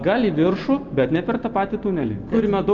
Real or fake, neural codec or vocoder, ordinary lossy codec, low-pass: real; none; Opus, 24 kbps; 7.2 kHz